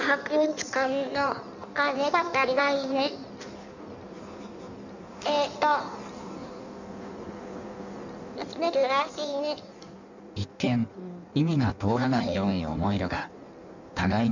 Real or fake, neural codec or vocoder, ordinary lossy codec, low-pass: fake; codec, 16 kHz in and 24 kHz out, 1.1 kbps, FireRedTTS-2 codec; none; 7.2 kHz